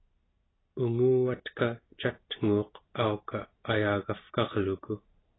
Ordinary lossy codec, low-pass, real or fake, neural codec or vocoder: AAC, 16 kbps; 7.2 kHz; real; none